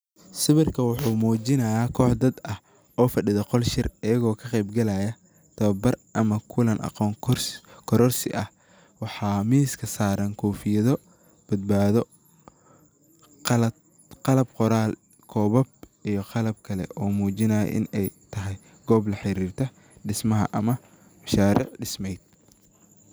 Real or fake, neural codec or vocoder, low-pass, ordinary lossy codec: real; none; none; none